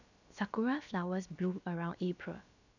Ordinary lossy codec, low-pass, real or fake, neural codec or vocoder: none; 7.2 kHz; fake; codec, 16 kHz, about 1 kbps, DyCAST, with the encoder's durations